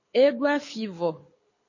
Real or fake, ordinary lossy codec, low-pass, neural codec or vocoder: fake; MP3, 32 kbps; 7.2 kHz; codec, 24 kHz, 6 kbps, HILCodec